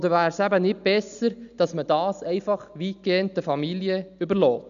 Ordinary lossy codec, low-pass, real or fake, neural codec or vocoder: none; 7.2 kHz; real; none